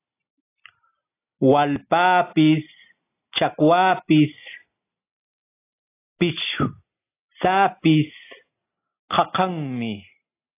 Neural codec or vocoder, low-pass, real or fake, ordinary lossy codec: none; 3.6 kHz; real; AAC, 24 kbps